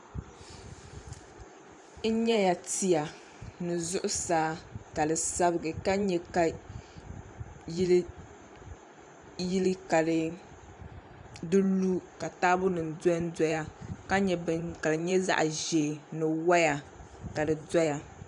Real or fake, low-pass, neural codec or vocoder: fake; 10.8 kHz; vocoder, 44.1 kHz, 128 mel bands every 512 samples, BigVGAN v2